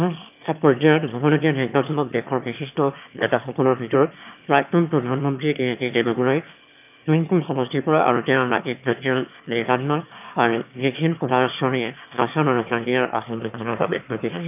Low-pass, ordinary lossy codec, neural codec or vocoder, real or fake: 3.6 kHz; none; autoencoder, 22.05 kHz, a latent of 192 numbers a frame, VITS, trained on one speaker; fake